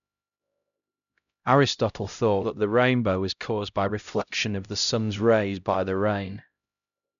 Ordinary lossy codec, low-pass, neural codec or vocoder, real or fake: none; 7.2 kHz; codec, 16 kHz, 0.5 kbps, X-Codec, HuBERT features, trained on LibriSpeech; fake